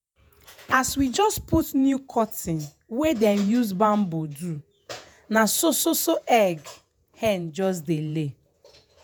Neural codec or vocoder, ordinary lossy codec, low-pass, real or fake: vocoder, 48 kHz, 128 mel bands, Vocos; none; none; fake